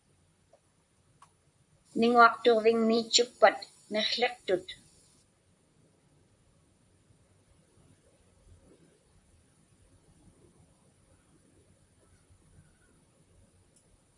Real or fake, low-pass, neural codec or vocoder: fake; 10.8 kHz; vocoder, 44.1 kHz, 128 mel bands, Pupu-Vocoder